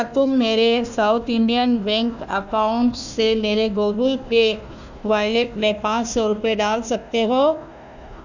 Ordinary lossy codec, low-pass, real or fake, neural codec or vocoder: none; 7.2 kHz; fake; codec, 16 kHz, 1 kbps, FunCodec, trained on Chinese and English, 50 frames a second